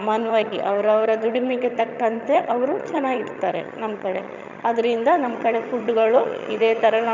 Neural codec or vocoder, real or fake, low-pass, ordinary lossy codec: vocoder, 22.05 kHz, 80 mel bands, HiFi-GAN; fake; 7.2 kHz; none